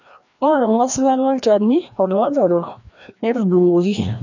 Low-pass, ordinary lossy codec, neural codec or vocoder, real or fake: 7.2 kHz; none; codec, 16 kHz, 1 kbps, FreqCodec, larger model; fake